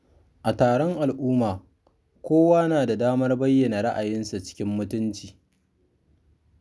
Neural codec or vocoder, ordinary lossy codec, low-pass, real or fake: none; none; none; real